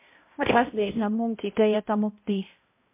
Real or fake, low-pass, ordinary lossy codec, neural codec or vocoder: fake; 3.6 kHz; MP3, 24 kbps; codec, 16 kHz, 0.5 kbps, X-Codec, HuBERT features, trained on balanced general audio